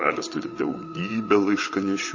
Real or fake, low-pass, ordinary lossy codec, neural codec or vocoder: fake; 7.2 kHz; MP3, 32 kbps; vocoder, 22.05 kHz, 80 mel bands, WaveNeXt